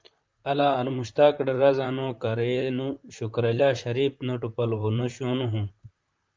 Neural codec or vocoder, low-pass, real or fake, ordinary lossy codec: vocoder, 44.1 kHz, 128 mel bands, Pupu-Vocoder; 7.2 kHz; fake; Opus, 24 kbps